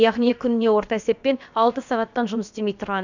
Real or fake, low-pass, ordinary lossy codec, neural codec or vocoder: fake; 7.2 kHz; none; codec, 16 kHz, about 1 kbps, DyCAST, with the encoder's durations